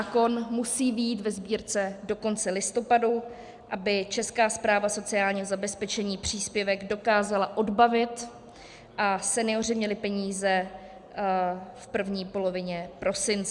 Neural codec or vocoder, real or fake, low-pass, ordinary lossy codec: none; real; 10.8 kHz; Opus, 64 kbps